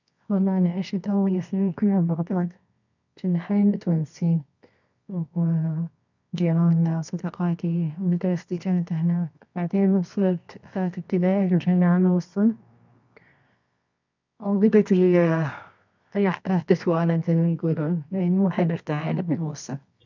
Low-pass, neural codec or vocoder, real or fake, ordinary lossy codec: 7.2 kHz; codec, 24 kHz, 0.9 kbps, WavTokenizer, medium music audio release; fake; none